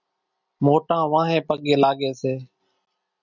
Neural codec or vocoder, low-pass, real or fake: none; 7.2 kHz; real